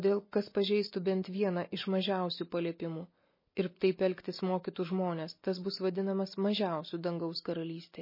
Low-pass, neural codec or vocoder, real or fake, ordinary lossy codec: 5.4 kHz; none; real; MP3, 24 kbps